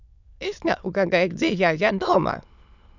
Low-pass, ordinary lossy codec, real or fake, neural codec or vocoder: 7.2 kHz; none; fake; autoencoder, 22.05 kHz, a latent of 192 numbers a frame, VITS, trained on many speakers